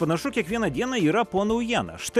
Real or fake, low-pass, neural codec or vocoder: real; 14.4 kHz; none